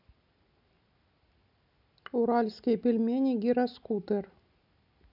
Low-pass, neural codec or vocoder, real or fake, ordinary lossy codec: 5.4 kHz; none; real; none